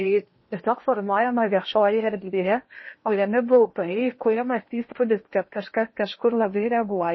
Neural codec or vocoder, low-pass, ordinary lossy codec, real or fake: codec, 16 kHz in and 24 kHz out, 0.8 kbps, FocalCodec, streaming, 65536 codes; 7.2 kHz; MP3, 24 kbps; fake